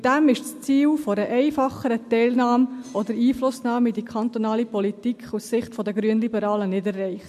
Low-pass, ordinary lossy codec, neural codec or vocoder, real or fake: 14.4 kHz; MP3, 64 kbps; none; real